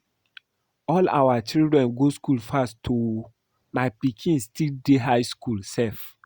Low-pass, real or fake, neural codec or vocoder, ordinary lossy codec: 19.8 kHz; real; none; Opus, 64 kbps